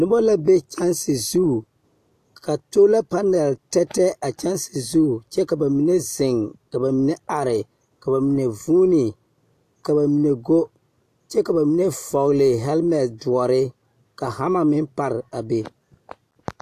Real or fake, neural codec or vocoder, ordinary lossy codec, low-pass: real; none; AAC, 64 kbps; 14.4 kHz